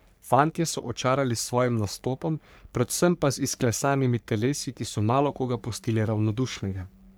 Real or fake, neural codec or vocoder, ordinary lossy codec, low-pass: fake; codec, 44.1 kHz, 3.4 kbps, Pupu-Codec; none; none